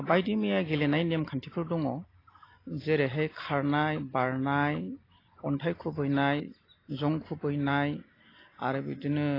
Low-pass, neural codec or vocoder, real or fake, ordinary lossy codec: 5.4 kHz; none; real; AAC, 24 kbps